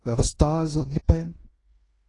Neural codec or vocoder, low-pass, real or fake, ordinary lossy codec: codec, 16 kHz in and 24 kHz out, 0.9 kbps, LongCat-Audio-Codec, fine tuned four codebook decoder; 10.8 kHz; fake; AAC, 32 kbps